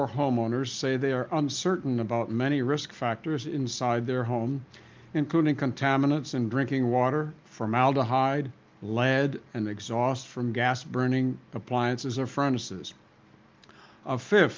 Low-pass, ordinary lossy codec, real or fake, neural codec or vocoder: 7.2 kHz; Opus, 32 kbps; real; none